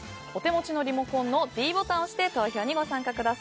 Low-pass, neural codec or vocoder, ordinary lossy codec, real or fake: none; none; none; real